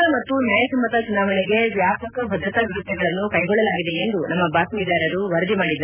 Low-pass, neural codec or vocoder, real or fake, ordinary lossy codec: 3.6 kHz; none; real; none